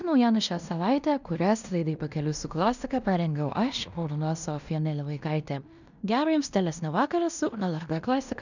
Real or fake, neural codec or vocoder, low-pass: fake; codec, 16 kHz in and 24 kHz out, 0.9 kbps, LongCat-Audio-Codec, fine tuned four codebook decoder; 7.2 kHz